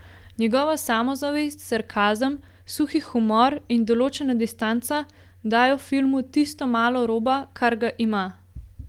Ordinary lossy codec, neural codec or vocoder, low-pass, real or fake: Opus, 24 kbps; none; 19.8 kHz; real